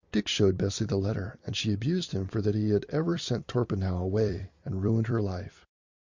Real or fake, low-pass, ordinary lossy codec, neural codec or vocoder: fake; 7.2 kHz; Opus, 64 kbps; vocoder, 44.1 kHz, 128 mel bands every 256 samples, BigVGAN v2